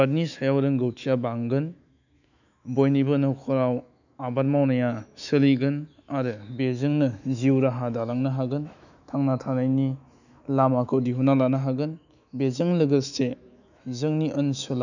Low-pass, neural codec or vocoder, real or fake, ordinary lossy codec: 7.2 kHz; autoencoder, 48 kHz, 128 numbers a frame, DAC-VAE, trained on Japanese speech; fake; none